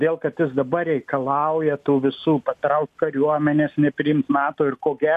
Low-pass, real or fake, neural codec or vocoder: 14.4 kHz; real; none